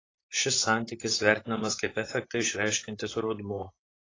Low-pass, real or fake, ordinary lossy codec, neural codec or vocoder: 7.2 kHz; fake; AAC, 32 kbps; vocoder, 44.1 kHz, 128 mel bands, Pupu-Vocoder